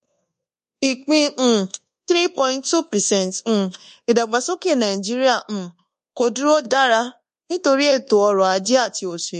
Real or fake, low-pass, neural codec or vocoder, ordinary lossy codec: fake; 10.8 kHz; codec, 24 kHz, 1.2 kbps, DualCodec; MP3, 48 kbps